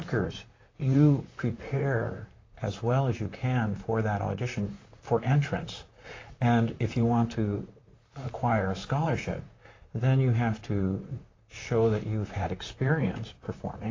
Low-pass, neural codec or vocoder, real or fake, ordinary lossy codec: 7.2 kHz; vocoder, 44.1 kHz, 128 mel bands, Pupu-Vocoder; fake; AAC, 32 kbps